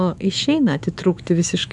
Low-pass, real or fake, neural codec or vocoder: 10.8 kHz; fake; autoencoder, 48 kHz, 128 numbers a frame, DAC-VAE, trained on Japanese speech